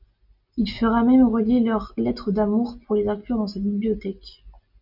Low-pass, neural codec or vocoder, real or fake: 5.4 kHz; none; real